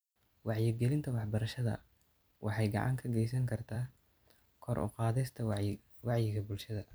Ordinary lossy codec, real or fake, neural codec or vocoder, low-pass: none; fake; vocoder, 44.1 kHz, 128 mel bands every 256 samples, BigVGAN v2; none